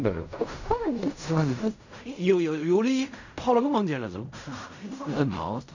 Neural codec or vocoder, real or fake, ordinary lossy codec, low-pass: codec, 16 kHz in and 24 kHz out, 0.4 kbps, LongCat-Audio-Codec, fine tuned four codebook decoder; fake; none; 7.2 kHz